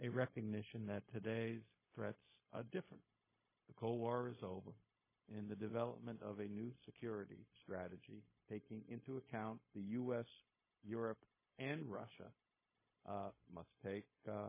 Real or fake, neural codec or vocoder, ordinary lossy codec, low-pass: fake; codec, 16 kHz, 0.4 kbps, LongCat-Audio-Codec; MP3, 16 kbps; 3.6 kHz